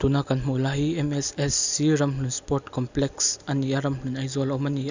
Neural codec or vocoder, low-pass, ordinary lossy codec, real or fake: none; 7.2 kHz; Opus, 64 kbps; real